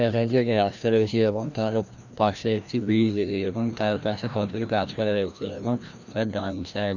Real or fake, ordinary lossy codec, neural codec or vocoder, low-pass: fake; none; codec, 16 kHz, 1 kbps, FreqCodec, larger model; 7.2 kHz